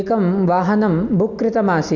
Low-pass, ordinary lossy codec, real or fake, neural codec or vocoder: 7.2 kHz; none; real; none